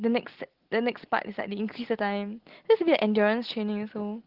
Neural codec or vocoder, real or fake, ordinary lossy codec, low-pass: none; real; Opus, 16 kbps; 5.4 kHz